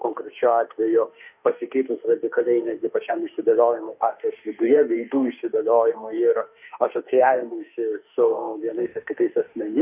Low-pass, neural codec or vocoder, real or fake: 3.6 kHz; autoencoder, 48 kHz, 32 numbers a frame, DAC-VAE, trained on Japanese speech; fake